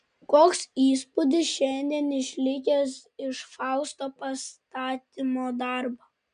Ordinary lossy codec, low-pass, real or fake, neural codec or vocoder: MP3, 96 kbps; 9.9 kHz; real; none